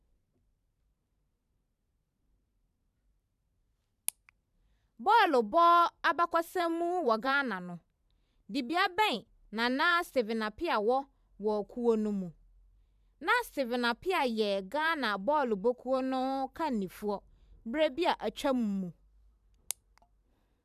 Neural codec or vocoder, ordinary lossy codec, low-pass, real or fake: vocoder, 44.1 kHz, 128 mel bands every 512 samples, BigVGAN v2; none; 14.4 kHz; fake